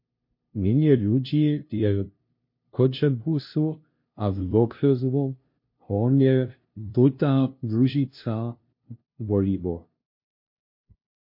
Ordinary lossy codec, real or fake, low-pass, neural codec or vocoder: MP3, 32 kbps; fake; 5.4 kHz; codec, 16 kHz, 0.5 kbps, FunCodec, trained on LibriTTS, 25 frames a second